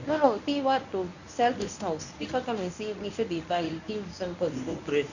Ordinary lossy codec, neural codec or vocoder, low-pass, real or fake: none; codec, 24 kHz, 0.9 kbps, WavTokenizer, medium speech release version 1; 7.2 kHz; fake